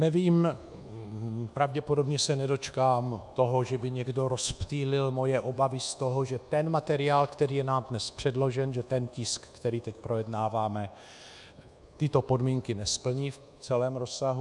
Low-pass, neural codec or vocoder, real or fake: 10.8 kHz; codec, 24 kHz, 1.2 kbps, DualCodec; fake